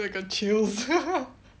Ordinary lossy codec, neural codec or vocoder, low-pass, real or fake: none; none; none; real